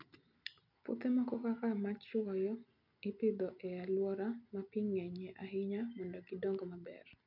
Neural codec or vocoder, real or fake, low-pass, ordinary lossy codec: none; real; 5.4 kHz; none